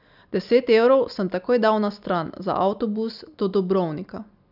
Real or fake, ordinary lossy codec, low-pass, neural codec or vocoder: real; none; 5.4 kHz; none